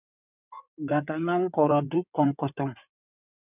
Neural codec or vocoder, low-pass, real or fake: codec, 16 kHz in and 24 kHz out, 2.2 kbps, FireRedTTS-2 codec; 3.6 kHz; fake